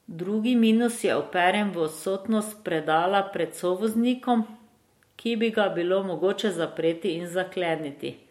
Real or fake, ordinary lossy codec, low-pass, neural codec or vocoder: real; MP3, 64 kbps; 19.8 kHz; none